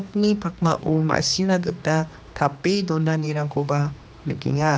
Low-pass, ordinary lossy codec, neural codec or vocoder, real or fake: none; none; codec, 16 kHz, 2 kbps, X-Codec, HuBERT features, trained on general audio; fake